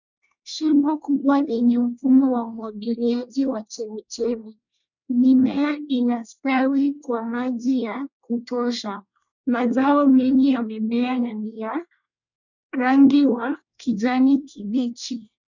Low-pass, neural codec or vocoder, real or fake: 7.2 kHz; codec, 24 kHz, 1 kbps, SNAC; fake